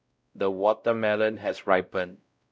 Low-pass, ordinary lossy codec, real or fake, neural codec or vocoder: none; none; fake; codec, 16 kHz, 0.5 kbps, X-Codec, WavLM features, trained on Multilingual LibriSpeech